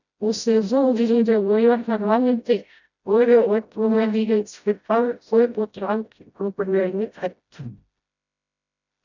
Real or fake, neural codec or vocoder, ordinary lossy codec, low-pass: fake; codec, 16 kHz, 0.5 kbps, FreqCodec, smaller model; none; 7.2 kHz